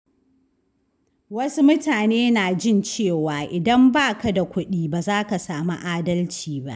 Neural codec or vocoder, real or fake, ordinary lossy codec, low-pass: none; real; none; none